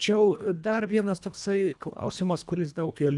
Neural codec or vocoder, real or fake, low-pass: codec, 24 kHz, 1.5 kbps, HILCodec; fake; 10.8 kHz